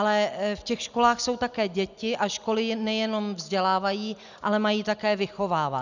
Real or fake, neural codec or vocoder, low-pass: real; none; 7.2 kHz